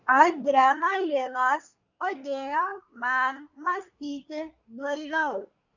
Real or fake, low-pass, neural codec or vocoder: fake; 7.2 kHz; codec, 24 kHz, 1 kbps, SNAC